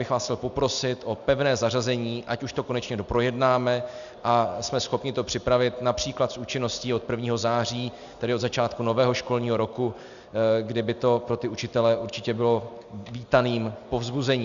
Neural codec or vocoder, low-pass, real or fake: none; 7.2 kHz; real